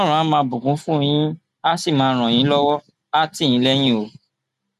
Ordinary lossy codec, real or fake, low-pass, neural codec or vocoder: none; real; 14.4 kHz; none